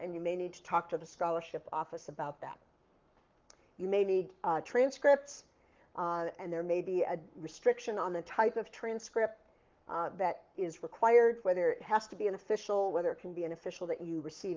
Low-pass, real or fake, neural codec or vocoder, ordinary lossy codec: 7.2 kHz; fake; codec, 44.1 kHz, 7.8 kbps, Pupu-Codec; Opus, 32 kbps